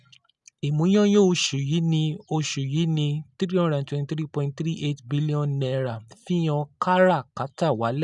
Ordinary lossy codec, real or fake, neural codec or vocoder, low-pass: none; real; none; 10.8 kHz